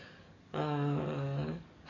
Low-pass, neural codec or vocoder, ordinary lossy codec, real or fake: 7.2 kHz; codec, 44.1 kHz, 7.8 kbps, DAC; none; fake